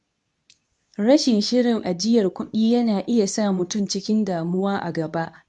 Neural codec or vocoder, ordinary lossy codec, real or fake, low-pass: codec, 24 kHz, 0.9 kbps, WavTokenizer, medium speech release version 2; none; fake; 10.8 kHz